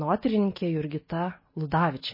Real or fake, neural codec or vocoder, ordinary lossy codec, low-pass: real; none; MP3, 24 kbps; 5.4 kHz